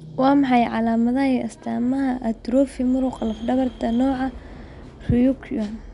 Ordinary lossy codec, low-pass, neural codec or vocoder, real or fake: none; 10.8 kHz; none; real